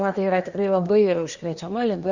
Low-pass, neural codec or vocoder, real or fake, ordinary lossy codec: 7.2 kHz; codec, 24 kHz, 1 kbps, SNAC; fake; Opus, 64 kbps